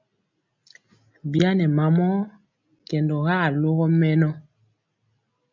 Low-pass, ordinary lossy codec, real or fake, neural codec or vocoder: 7.2 kHz; MP3, 64 kbps; real; none